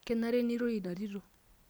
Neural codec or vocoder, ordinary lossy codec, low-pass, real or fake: none; none; none; real